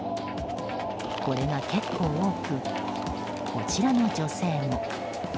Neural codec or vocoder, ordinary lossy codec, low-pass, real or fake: none; none; none; real